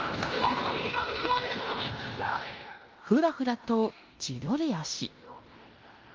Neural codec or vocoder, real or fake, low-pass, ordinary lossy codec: codec, 16 kHz in and 24 kHz out, 0.9 kbps, LongCat-Audio-Codec, fine tuned four codebook decoder; fake; 7.2 kHz; Opus, 24 kbps